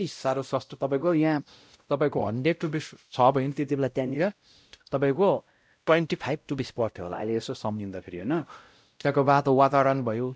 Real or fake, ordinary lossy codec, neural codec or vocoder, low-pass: fake; none; codec, 16 kHz, 0.5 kbps, X-Codec, WavLM features, trained on Multilingual LibriSpeech; none